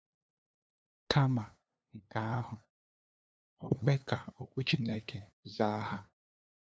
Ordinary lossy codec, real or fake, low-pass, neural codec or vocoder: none; fake; none; codec, 16 kHz, 2 kbps, FunCodec, trained on LibriTTS, 25 frames a second